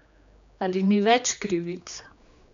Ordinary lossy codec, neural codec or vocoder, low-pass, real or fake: MP3, 64 kbps; codec, 16 kHz, 2 kbps, X-Codec, HuBERT features, trained on general audio; 7.2 kHz; fake